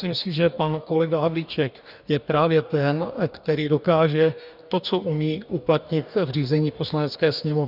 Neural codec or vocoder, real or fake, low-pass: codec, 44.1 kHz, 2.6 kbps, DAC; fake; 5.4 kHz